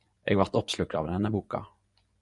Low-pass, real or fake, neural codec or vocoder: 10.8 kHz; fake; vocoder, 24 kHz, 100 mel bands, Vocos